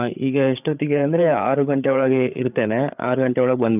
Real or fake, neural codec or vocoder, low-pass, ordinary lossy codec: fake; codec, 16 kHz, 8 kbps, FreqCodec, larger model; 3.6 kHz; none